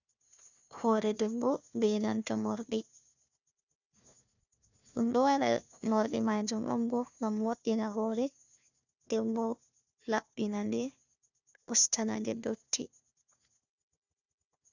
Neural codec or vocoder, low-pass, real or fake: codec, 16 kHz, 1 kbps, FunCodec, trained on Chinese and English, 50 frames a second; 7.2 kHz; fake